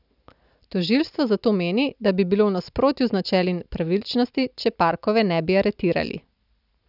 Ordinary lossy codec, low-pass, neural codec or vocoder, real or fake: none; 5.4 kHz; none; real